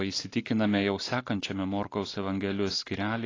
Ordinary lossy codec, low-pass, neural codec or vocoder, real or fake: AAC, 32 kbps; 7.2 kHz; none; real